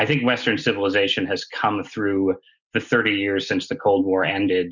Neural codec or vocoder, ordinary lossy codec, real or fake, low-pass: none; Opus, 64 kbps; real; 7.2 kHz